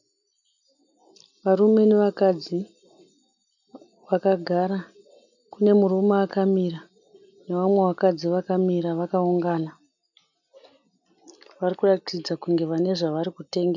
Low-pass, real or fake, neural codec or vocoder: 7.2 kHz; real; none